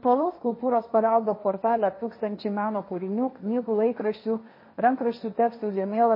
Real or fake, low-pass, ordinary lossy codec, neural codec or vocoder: fake; 5.4 kHz; MP3, 24 kbps; codec, 16 kHz, 1.1 kbps, Voila-Tokenizer